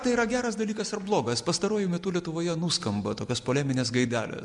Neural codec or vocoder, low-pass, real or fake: none; 10.8 kHz; real